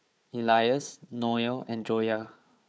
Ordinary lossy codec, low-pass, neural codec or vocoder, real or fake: none; none; codec, 16 kHz, 4 kbps, FunCodec, trained on Chinese and English, 50 frames a second; fake